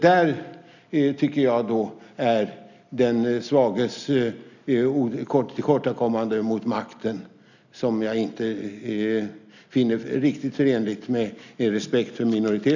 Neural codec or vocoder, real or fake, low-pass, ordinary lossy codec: none; real; 7.2 kHz; none